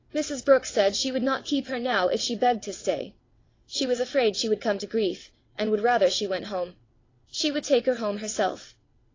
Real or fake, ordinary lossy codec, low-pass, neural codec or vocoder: fake; AAC, 32 kbps; 7.2 kHz; vocoder, 22.05 kHz, 80 mel bands, WaveNeXt